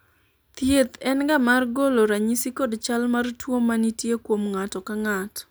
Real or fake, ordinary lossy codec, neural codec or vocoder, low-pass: real; none; none; none